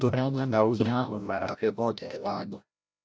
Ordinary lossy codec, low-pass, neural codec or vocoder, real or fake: none; none; codec, 16 kHz, 0.5 kbps, FreqCodec, larger model; fake